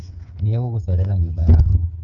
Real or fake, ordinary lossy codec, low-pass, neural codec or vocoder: fake; none; 7.2 kHz; codec, 16 kHz, 8 kbps, FreqCodec, smaller model